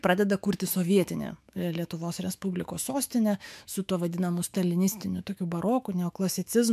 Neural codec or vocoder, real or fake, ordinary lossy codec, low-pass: autoencoder, 48 kHz, 128 numbers a frame, DAC-VAE, trained on Japanese speech; fake; MP3, 96 kbps; 14.4 kHz